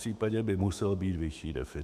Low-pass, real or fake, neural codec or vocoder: 14.4 kHz; fake; autoencoder, 48 kHz, 128 numbers a frame, DAC-VAE, trained on Japanese speech